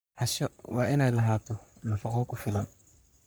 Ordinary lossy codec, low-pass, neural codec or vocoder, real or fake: none; none; codec, 44.1 kHz, 3.4 kbps, Pupu-Codec; fake